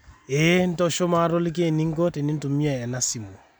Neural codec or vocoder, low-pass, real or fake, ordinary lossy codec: none; none; real; none